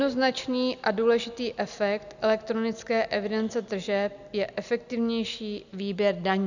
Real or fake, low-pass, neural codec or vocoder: real; 7.2 kHz; none